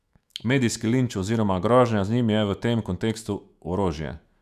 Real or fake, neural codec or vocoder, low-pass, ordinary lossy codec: fake; autoencoder, 48 kHz, 128 numbers a frame, DAC-VAE, trained on Japanese speech; 14.4 kHz; none